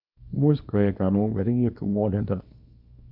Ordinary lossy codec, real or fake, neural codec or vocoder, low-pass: none; fake; codec, 24 kHz, 0.9 kbps, WavTokenizer, small release; 5.4 kHz